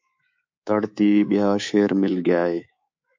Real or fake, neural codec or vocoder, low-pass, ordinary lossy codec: fake; codec, 24 kHz, 3.1 kbps, DualCodec; 7.2 kHz; MP3, 48 kbps